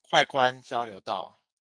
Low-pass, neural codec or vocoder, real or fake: 9.9 kHz; codec, 44.1 kHz, 2.6 kbps, SNAC; fake